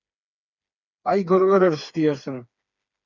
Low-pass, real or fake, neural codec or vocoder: 7.2 kHz; fake; codec, 16 kHz, 4 kbps, FreqCodec, smaller model